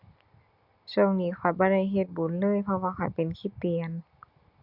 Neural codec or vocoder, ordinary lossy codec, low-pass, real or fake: none; Opus, 64 kbps; 5.4 kHz; real